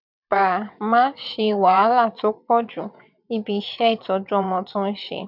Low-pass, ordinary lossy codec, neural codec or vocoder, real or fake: 5.4 kHz; none; vocoder, 22.05 kHz, 80 mel bands, WaveNeXt; fake